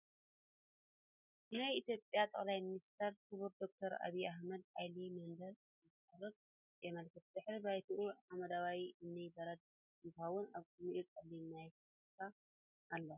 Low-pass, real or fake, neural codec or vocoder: 3.6 kHz; real; none